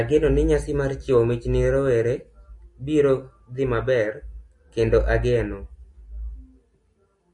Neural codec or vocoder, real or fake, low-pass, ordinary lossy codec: none; real; 10.8 kHz; MP3, 48 kbps